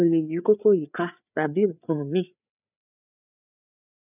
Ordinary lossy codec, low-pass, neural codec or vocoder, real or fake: none; 3.6 kHz; codec, 16 kHz, 4 kbps, FreqCodec, larger model; fake